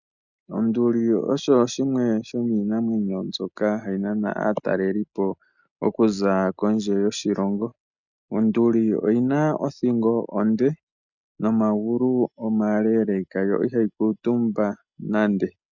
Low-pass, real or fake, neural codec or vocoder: 7.2 kHz; real; none